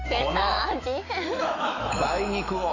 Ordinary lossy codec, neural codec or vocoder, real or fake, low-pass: AAC, 32 kbps; autoencoder, 48 kHz, 128 numbers a frame, DAC-VAE, trained on Japanese speech; fake; 7.2 kHz